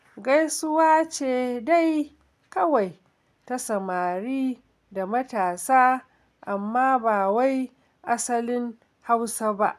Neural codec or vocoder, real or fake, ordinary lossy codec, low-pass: none; real; none; 14.4 kHz